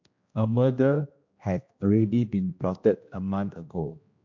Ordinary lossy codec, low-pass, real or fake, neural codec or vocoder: MP3, 48 kbps; 7.2 kHz; fake; codec, 16 kHz, 1 kbps, X-Codec, HuBERT features, trained on general audio